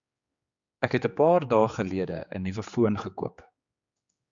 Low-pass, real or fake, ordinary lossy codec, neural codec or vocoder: 7.2 kHz; fake; Opus, 64 kbps; codec, 16 kHz, 4 kbps, X-Codec, HuBERT features, trained on general audio